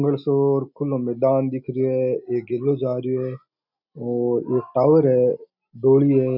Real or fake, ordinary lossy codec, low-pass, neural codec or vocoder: real; none; 5.4 kHz; none